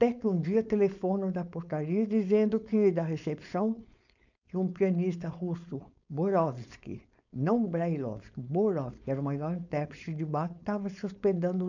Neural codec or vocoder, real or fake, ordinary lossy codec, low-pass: codec, 16 kHz, 4.8 kbps, FACodec; fake; none; 7.2 kHz